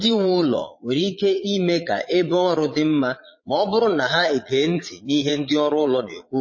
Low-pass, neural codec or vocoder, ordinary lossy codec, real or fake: 7.2 kHz; vocoder, 22.05 kHz, 80 mel bands, Vocos; MP3, 32 kbps; fake